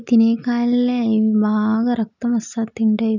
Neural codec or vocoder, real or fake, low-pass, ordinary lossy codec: none; real; 7.2 kHz; none